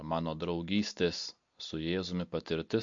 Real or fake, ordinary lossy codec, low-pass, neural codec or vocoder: real; MP3, 48 kbps; 7.2 kHz; none